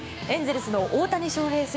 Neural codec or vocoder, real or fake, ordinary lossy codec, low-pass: codec, 16 kHz, 6 kbps, DAC; fake; none; none